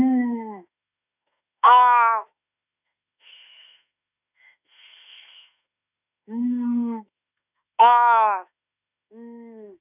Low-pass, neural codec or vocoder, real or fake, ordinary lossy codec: 3.6 kHz; autoencoder, 48 kHz, 32 numbers a frame, DAC-VAE, trained on Japanese speech; fake; none